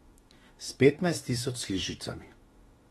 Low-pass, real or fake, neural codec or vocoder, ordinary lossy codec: 19.8 kHz; fake; autoencoder, 48 kHz, 32 numbers a frame, DAC-VAE, trained on Japanese speech; AAC, 32 kbps